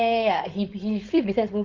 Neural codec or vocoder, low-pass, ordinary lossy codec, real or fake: codec, 16 kHz, 4.8 kbps, FACodec; 7.2 kHz; Opus, 32 kbps; fake